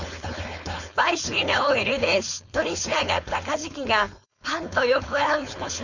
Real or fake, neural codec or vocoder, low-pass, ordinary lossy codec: fake; codec, 16 kHz, 4.8 kbps, FACodec; 7.2 kHz; none